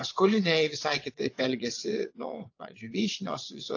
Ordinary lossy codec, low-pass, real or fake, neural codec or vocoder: AAC, 48 kbps; 7.2 kHz; fake; vocoder, 22.05 kHz, 80 mel bands, WaveNeXt